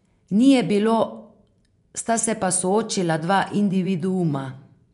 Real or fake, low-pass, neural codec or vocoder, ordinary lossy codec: fake; 10.8 kHz; vocoder, 24 kHz, 100 mel bands, Vocos; none